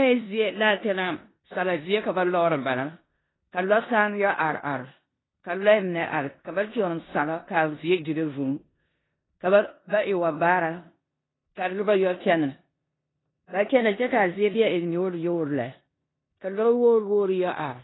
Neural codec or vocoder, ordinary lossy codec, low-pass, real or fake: codec, 16 kHz in and 24 kHz out, 0.9 kbps, LongCat-Audio-Codec, four codebook decoder; AAC, 16 kbps; 7.2 kHz; fake